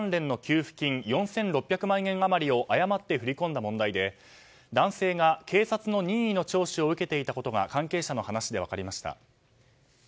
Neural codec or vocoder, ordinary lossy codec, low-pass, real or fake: none; none; none; real